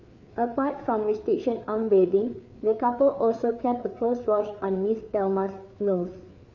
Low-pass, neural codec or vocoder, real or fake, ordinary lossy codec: 7.2 kHz; codec, 16 kHz, 4 kbps, FreqCodec, larger model; fake; none